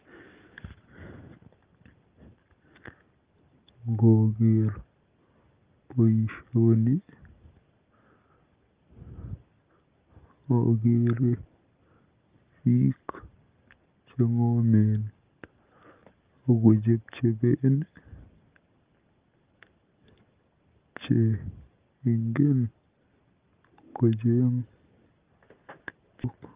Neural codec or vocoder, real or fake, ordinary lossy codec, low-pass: none; real; Opus, 32 kbps; 3.6 kHz